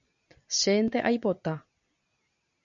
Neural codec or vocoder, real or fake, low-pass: none; real; 7.2 kHz